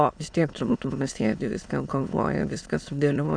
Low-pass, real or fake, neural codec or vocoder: 9.9 kHz; fake; autoencoder, 22.05 kHz, a latent of 192 numbers a frame, VITS, trained on many speakers